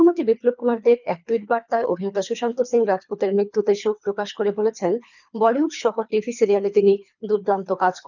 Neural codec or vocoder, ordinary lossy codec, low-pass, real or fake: codec, 24 kHz, 3 kbps, HILCodec; none; 7.2 kHz; fake